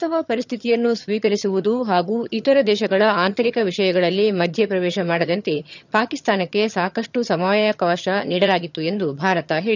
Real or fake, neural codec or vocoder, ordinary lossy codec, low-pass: fake; vocoder, 22.05 kHz, 80 mel bands, HiFi-GAN; none; 7.2 kHz